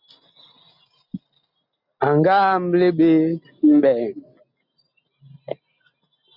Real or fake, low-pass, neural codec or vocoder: real; 5.4 kHz; none